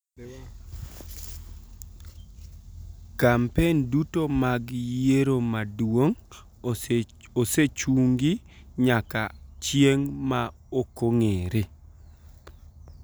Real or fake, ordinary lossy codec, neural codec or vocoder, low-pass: real; none; none; none